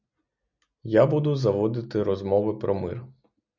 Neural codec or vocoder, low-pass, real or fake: none; 7.2 kHz; real